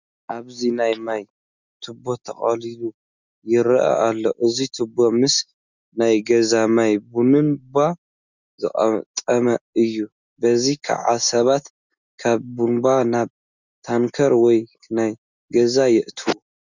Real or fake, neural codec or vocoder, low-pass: real; none; 7.2 kHz